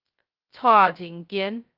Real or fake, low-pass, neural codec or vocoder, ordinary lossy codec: fake; 5.4 kHz; codec, 16 kHz, 0.2 kbps, FocalCodec; Opus, 32 kbps